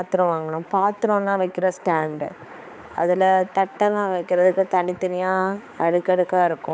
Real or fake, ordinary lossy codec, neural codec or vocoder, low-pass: fake; none; codec, 16 kHz, 4 kbps, X-Codec, HuBERT features, trained on balanced general audio; none